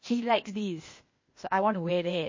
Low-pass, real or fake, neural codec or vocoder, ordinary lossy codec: 7.2 kHz; fake; codec, 16 kHz, 0.8 kbps, ZipCodec; MP3, 32 kbps